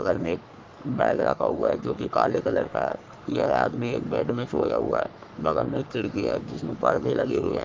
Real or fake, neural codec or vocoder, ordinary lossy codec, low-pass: fake; codec, 44.1 kHz, 3.4 kbps, Pupu-Codec; Opus, 24 kbps; 7.2 kHz